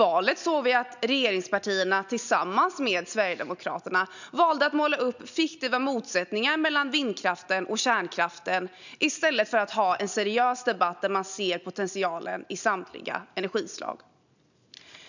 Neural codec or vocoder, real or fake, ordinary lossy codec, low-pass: none; real; none; 7.2 kHz